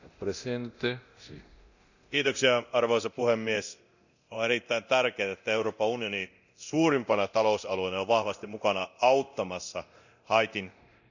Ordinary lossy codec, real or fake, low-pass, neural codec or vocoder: none; fake; 7.2 kHz; codec, 24 kHz, 0.9 kbps, DualCodec